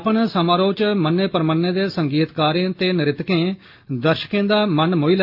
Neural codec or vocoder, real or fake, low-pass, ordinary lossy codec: none; real; 5.4 kHz; Opus, 24 kbps